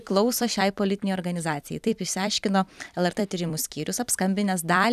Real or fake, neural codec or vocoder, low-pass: fake; vocoder, 44.1 kHz, 128 mel bands every 256 samples, BigVGAN v2; 14.4 kHz